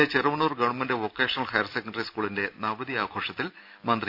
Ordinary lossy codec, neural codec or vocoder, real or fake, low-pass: none; none; real; 5.4 kHz